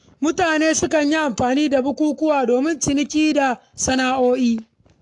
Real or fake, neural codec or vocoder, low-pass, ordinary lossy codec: fake; codec, 44.1 kHz, 7.8 kbps, Pupu-Codec; 10.8 kHz; AAC, 64 kbps